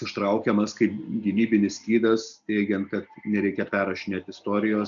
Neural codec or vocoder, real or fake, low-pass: none; real; 7.2 kHz